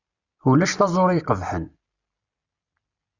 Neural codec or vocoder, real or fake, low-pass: none; real; 7.2 kHz